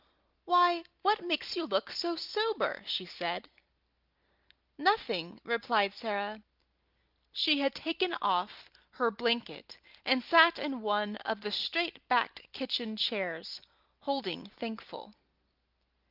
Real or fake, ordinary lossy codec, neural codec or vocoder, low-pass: real; Opus, 32 kbps; none; 5.4 kHz